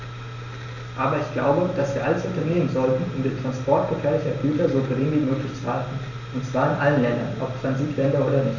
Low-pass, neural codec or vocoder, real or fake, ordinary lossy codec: 7.2 kHz; none; real; none